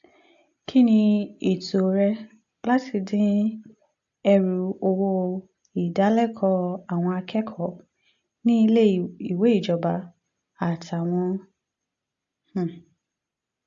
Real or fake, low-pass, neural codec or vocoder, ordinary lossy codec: real; 7.2 kHz; none; AAC, 64 kbps